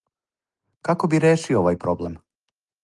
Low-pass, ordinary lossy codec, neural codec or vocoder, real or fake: 10.8 kHz; Opus, 24 kbps; none; real